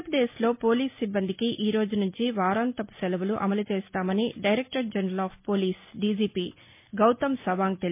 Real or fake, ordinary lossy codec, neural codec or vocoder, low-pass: real; MP3, 24 kbps; none; 3.6 kHz